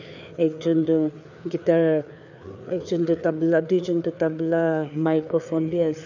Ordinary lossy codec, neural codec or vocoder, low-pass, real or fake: none; codec, 16 kHz, 4 kbps, FreqCodec, larger model; 7.2 kHz; fake